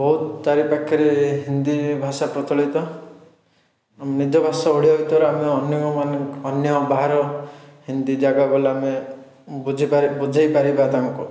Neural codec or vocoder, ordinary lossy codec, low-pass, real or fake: none; none; none; real